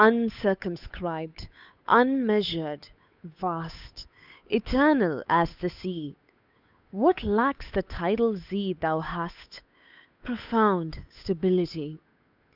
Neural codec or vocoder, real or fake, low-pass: codec, 16 kHz, 8 kbps, FunCodec, trained on Chinese and English, 25 frames a second; fake; 5.4 kHz